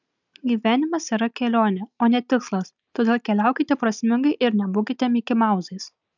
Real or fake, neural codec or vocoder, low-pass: real; none; 7.2 kHz